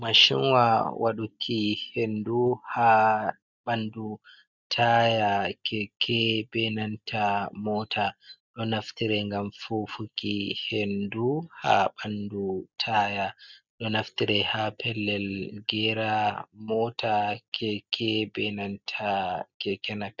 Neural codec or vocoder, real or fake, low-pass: none; real; 7.2 kHz